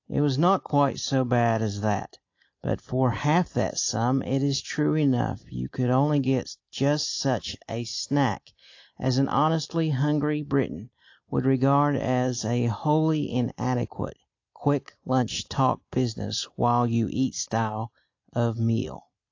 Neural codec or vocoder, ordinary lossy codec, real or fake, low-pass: none; AAC, 48 kbps; real; 7.2 kHz